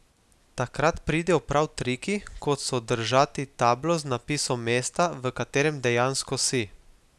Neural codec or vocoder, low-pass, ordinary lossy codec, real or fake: none; none; none; real